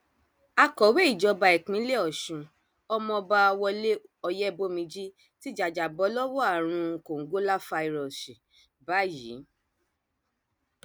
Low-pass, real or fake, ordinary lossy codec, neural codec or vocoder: none; real; none; none